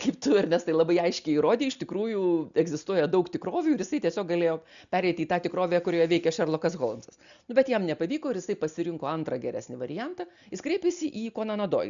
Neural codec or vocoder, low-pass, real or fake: none; 7.2 kHz; real